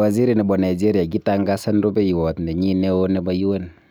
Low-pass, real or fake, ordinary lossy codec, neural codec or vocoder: none; real; none; none